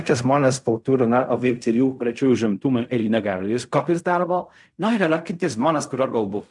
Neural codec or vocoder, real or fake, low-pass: codec, 16 kHz in and 24 kHz out, 0.4 kbps, LongCat-Audio-Codec, fine tuned four codebook decoder; fake; 10.8 kHz